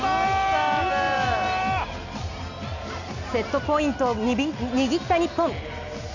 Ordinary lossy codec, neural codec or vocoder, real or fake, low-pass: none; none; real; 7.2 kHz